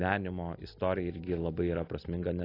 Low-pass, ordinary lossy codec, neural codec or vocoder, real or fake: 5.4 kHz; AAC, 24 kbps; none; real